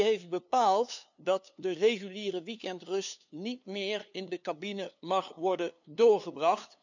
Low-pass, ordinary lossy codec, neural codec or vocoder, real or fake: 7.2 kHz; none; codec, 16 kHz, 2 kbps, FunCodec, trained on LibriTTS, 25 frames a second; fake